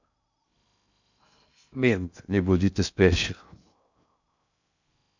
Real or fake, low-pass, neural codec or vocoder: fake; 7.2 kHz; codec, 16 kHz in and 24 kHz out, 0.6 kbps, FocalCodec, streaming, 2048 codes